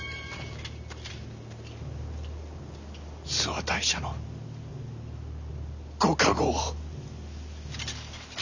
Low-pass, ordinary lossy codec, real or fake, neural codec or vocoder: 7.2 kHz; none; real; none